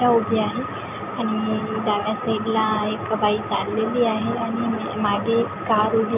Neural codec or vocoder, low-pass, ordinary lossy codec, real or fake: none; 3.6 kHz; none; real